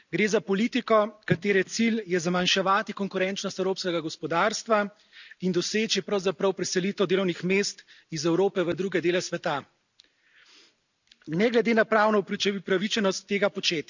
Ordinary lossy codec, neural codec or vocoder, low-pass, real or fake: none; none; 7.2 kHz; real